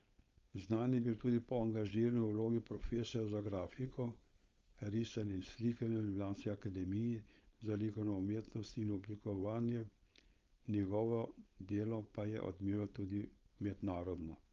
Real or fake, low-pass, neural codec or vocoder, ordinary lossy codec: fake; 7.2 kHz; codec, 16 kHz, 4.8 kbps, FACodec; Opus, 32 kbps